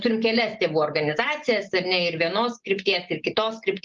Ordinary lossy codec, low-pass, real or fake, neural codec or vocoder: Opus, 32 kbps; 7.2 kHz; real; none